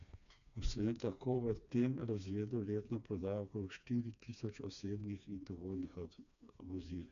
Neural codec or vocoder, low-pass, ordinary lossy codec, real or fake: codec, 16 kHz, 2 kbps, FreqCodec, smaller model; 7.2 kHz; none; fake